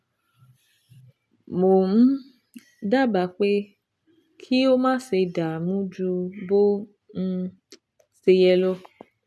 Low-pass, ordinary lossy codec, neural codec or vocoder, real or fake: none; none; none; real